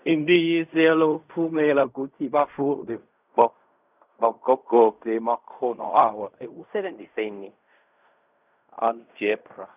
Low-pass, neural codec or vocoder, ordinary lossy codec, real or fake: 3.6 kHz; codec, 16 kHz in and 24 kHz out, 0.4 kbps, LongCat-Audio-Codec, fine tuned four codebook decoder; none; fake